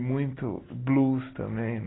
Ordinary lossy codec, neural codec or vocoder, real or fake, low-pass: AAC, 16 kbps; none; real; 7.2 kHz